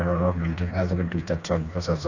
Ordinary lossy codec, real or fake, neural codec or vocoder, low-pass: none; fake; codec, 16 kHz, 2 kbps, FreqCodec, smaller model; 7.2 kHz